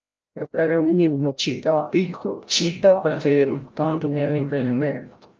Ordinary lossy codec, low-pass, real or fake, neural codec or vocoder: Opus, 24 kbps; 7.2 kHz; fake; codec, 16 kHz, 0.5 kbps, FreqCodec, larger model